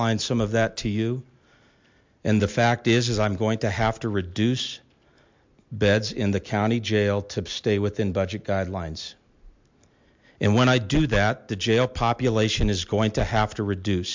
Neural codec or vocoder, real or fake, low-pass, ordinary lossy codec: none; real; 7.2 kHz; MP3, 64 kbps